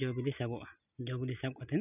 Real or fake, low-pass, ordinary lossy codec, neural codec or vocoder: real; 3.6 kHz; none; none